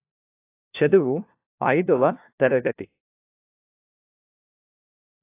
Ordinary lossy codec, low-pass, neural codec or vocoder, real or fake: AAC, 24 kbps; 3.6 kHz; codec, 16 kHz, 1 kbps, FunCodec, trained on LibriTTS, 50 frames a second; fake